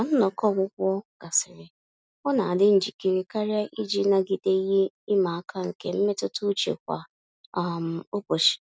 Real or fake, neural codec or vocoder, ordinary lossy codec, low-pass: real; none; none; none